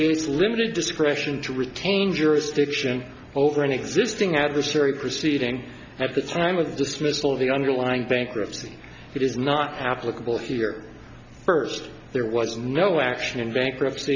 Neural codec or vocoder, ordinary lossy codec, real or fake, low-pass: none; MP3, 64 kbps; real; 7.2 kHz